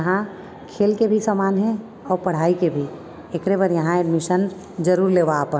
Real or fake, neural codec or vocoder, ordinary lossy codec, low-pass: real; none; none; none